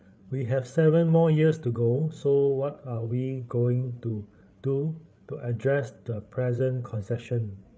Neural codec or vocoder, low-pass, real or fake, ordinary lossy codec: codec, 16 kHz, 8 kbps, FreqCodec, larger model; none; fake; none